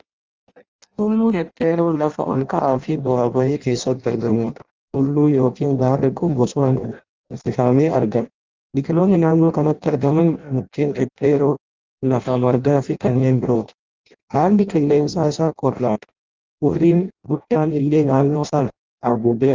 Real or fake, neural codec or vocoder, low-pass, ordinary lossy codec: fake; codec, 16 kHz in and 24 kHz out, 0.6 kbps, FireRedTTS-2 codec; 7.2 kHz; Opus, 24 kbps